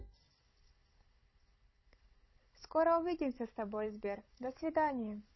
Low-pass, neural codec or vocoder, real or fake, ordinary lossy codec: 7.2 kHz; codec, 16 kHz, 16 kbps, FunCodec, trained on Chinese and English, 50 frames a second; fake; MP3, 24 kbps